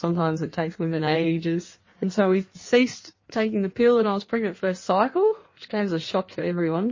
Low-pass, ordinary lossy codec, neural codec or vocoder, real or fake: 7.2 kHz; MP3, 32 kbps; codec, 16 kHz in and 24 kHz out, 1.1 kbps, FireRedTTS-2 codec; fake